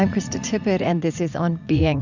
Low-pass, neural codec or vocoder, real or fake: 7.2 kHz; vocoder, 44.1 kHz, 80 mel bands, Vocos; fake